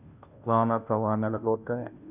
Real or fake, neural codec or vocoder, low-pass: fake; codec, 16 kHz, 0.5 kbps, FunCodec, trained on Chinese and English, 25 frames a second; 3.6 kHz